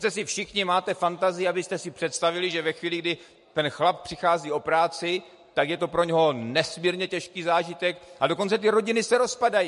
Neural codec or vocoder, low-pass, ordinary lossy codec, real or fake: none; 14.4 kHz; MP3, 48 kbps; real